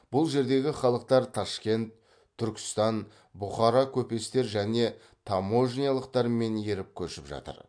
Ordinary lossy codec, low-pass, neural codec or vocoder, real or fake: MP3, 64 kbps; 9.9 kHz; none; real